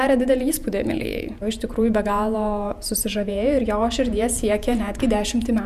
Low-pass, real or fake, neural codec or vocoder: 14.4 kHz; fake; vocoder, 48 kHz, 128 mel bands, Vocos